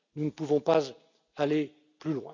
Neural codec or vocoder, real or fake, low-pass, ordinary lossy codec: none; real; 7.2 kHz; none